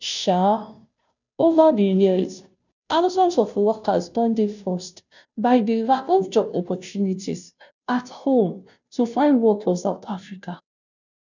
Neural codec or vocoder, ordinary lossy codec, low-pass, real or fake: codec, 16 kHz, 0.5 kbps, FunCodec, trained on Chinese and English, 25 frames a second; none; 7.2 kHz; fake